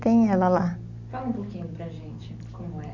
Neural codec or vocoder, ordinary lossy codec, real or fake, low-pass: none; none; real; 7.2 kHz